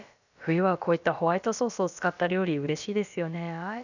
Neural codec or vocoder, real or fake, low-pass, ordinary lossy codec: codec, 16 kHz, about 1 kbps, DyCAST, with the encoder's durations; fake; 7.2 kHz; none